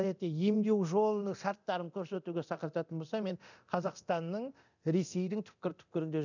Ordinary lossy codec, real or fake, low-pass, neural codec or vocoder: none; fake; 7.2 kHz; codec, 24 kHz, 0.9 kbps, DualCodec